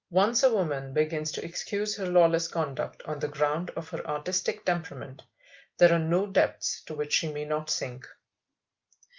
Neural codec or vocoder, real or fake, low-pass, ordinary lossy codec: none; real; 7.2 kHz; Opus, 24 kbps